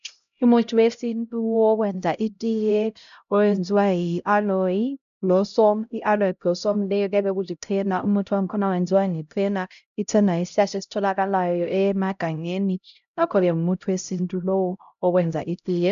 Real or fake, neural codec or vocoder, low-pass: fake; codec, 16 kHz, 0.5 kbps, X-Codec, HuBERT features, trained on LibriSpeech; 7.2 kHz